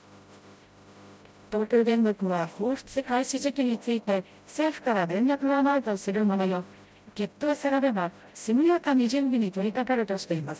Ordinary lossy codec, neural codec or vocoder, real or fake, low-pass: none; codec, 16 kHz, 0.5 kbps, FreqCodec, smaller model; fake; none